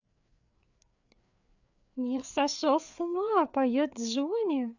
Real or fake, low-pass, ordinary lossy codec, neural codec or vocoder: fake; 7.2 kHz; none; codec, 16 kHz, 4 kbps, FreqCodec, larger model